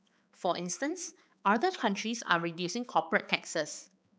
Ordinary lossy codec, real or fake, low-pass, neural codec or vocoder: none; fake; none; codec, 16 kHz, 4 kbps, X-Codec, HuBERT features, trained on balanced general audio